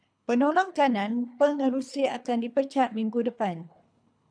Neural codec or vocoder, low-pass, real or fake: codec, 24 kHz, 3 kbps, HILCodec; 9.9 kHz; fake